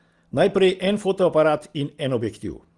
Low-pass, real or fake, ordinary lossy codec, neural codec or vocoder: 10.8 kHz; real; Opus, 24 kbps; none